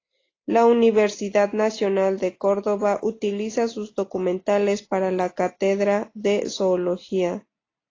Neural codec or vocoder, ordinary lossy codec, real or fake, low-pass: none; AAC, 32 kbps; real; 7.2 kHz